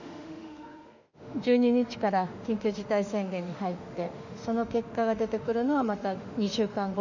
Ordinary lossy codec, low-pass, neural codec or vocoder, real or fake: none; 7.2 kHz; autoencoder, 48 kHz, 32 numbers a frame, DAC-VAE, trained on Japanese speech; fake